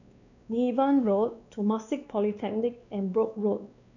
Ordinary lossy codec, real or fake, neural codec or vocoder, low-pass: none; fake; codec, 16 kHz, 2 kbps, X-Codec, WavLM features, trained on Multilingual LibriSpeech; 7.2 kHz